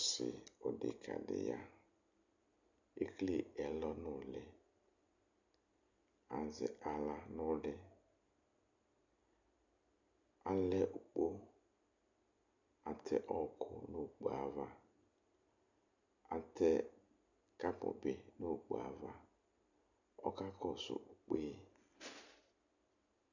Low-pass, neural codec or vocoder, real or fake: 7.2 kHz; none; real